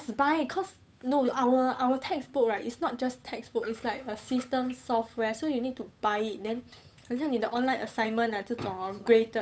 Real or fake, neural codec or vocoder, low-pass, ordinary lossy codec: fake; codec, 16 kHz, 8 kbps, FunCodec, trained on Chinese and English, 25 frames a second; none; none